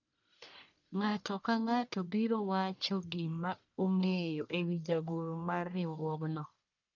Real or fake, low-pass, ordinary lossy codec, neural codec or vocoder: fake; 7.2 kHz; none; codec, 44.1 kHz, 1.7 kbps, Pupu-Codec